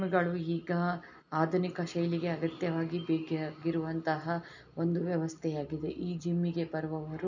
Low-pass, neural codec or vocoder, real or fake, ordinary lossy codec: 7.2 kHz; none; real; none